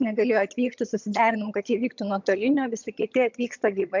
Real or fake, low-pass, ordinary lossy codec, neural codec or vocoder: fake; 7.2 kHz; MP3, 64 kbps; vocoder, 22.05 kHz, 80 mel bands, HiFi-GAN